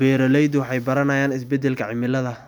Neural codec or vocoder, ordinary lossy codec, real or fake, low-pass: none; none; real; 19.8 kHz